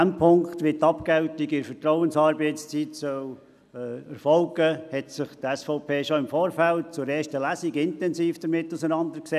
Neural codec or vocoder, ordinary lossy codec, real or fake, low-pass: none; none; real; 14.4 kHz